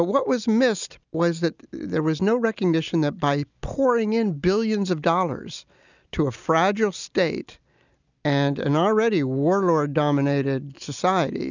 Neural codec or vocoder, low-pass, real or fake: none; 7.2 kHz; real